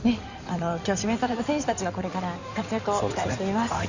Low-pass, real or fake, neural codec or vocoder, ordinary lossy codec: 7.2 kHz; fake; codec, 16 kHz in and 24 kHz out, 2.2 kbps, FireRedTTS-2 codec; Opus, 64 kbps